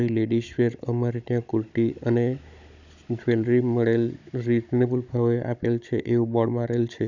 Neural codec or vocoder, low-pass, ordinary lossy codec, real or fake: codec, 16 kHz, 16 kbps, FunCodec, trained on Chinese and English, 50 frames a second; 7.2 kHz; none; fake